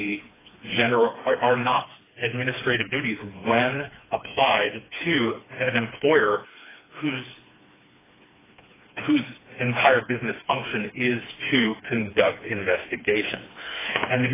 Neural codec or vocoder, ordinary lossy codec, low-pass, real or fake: codec, 16 kHz, 2 kbps, FreqCodec, smaller model; AAC, 16 kbps; 3.6 kHz; fake